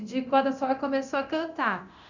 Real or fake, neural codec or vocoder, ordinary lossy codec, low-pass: fake; codec, 24 kHz, 0.9 kbps, DualCodec; none; 7.2 kHz